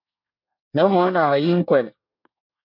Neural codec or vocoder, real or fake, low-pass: codec, 24 kHz, 1 kbps, SNAC; fake; 5.4 kHz